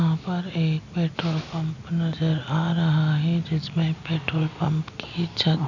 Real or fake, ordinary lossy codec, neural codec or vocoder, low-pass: real; none; none; 7.2 kHz